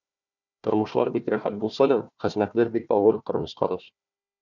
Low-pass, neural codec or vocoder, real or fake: 7.2 kHz; codec, 16 kHz, 1 kbps, FunCodec, trained on Chinese and English, 50 frames a second; fake